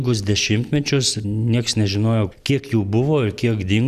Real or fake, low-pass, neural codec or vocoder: real; 14.4 kHz; none